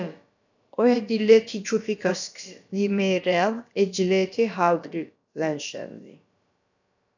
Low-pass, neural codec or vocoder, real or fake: 7.2 kHz; codec, 16 kHz, about 1 kbps, DyCAST, with the encoder's durations; fake